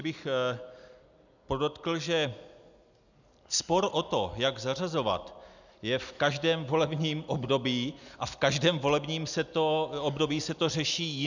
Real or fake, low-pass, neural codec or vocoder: real; 7.2 kHz; none